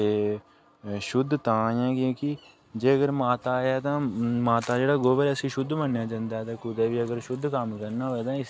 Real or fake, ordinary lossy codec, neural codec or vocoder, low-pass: real; none; none; none